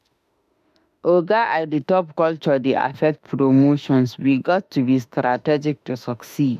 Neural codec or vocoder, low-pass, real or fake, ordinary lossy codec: autoencoder, 48 kHz, 32 numbers a frame, DAC-VAE, trained on Japanese speech; 14.4 kHz; fake; none